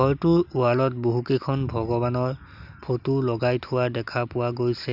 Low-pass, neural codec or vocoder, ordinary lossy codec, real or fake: 5.4 kHz; none; none; real